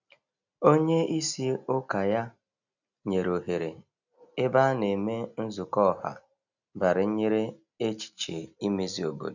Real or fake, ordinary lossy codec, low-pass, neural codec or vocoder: real; none; 7.2 kHz; none